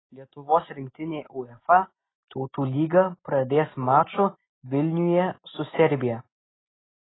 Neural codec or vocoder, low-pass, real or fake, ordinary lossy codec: none; 7.2 kHz; real; AAC, 16 kbps